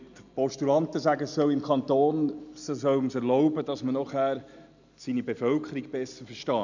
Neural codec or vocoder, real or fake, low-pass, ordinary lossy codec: none; real; 7.2 kHz; none